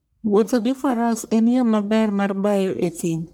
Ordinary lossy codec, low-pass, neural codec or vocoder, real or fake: none; none; codec, 44.1 kHz, 1.7 kbps, Pupu-Codec; fake